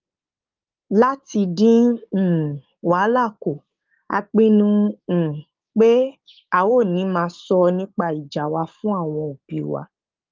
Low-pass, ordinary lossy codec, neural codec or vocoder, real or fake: 7.2 kHz; Opus, 32 kbps; codec, 16 kHz, 6 kbps, DAC; fake